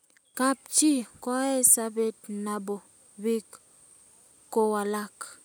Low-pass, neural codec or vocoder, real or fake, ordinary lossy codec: none; none; real; none